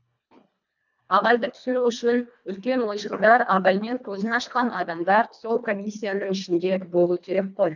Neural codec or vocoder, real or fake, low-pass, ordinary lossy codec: codec, 24 kHz, 1.5 kbps, HILCodec; fake; 7.2 kHz; none